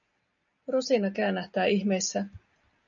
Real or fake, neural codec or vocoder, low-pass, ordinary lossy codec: real; none; 7.2 kHz; MP3, 96 kbps